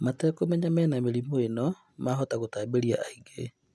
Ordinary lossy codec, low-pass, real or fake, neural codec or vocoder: none; none; real; none